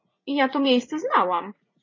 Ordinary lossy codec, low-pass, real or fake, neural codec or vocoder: MP3, 32 kbps; 7.2 kHz; fake; vocoder, 44.1 kHz, 80 mel bands, Vocos